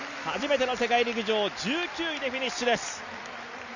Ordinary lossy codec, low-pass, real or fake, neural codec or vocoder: none; 7.2 kHz; real; none